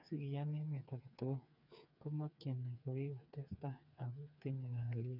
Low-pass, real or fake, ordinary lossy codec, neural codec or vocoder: 5.4 kHz; fake; none; codec, 16 kHz, 4 kbps, FreqCodec, smaller model